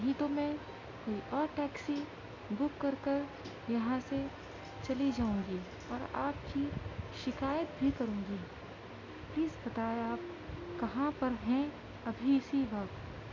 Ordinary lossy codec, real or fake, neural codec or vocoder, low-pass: MP3, 64 kbps; real; none; 7.2 kHz